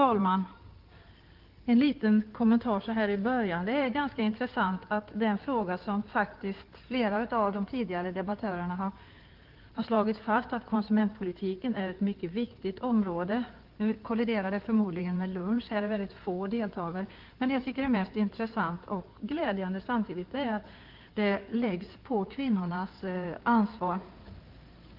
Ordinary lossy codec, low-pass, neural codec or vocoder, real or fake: Opus, 24 kbps; 5.4 kHz; codec, 16 kHz in and 24 kHz out, 2.2 kbps, FireRedTTS-2 codec; fake